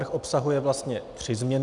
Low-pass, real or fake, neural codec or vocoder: 10.8 kHz; real; none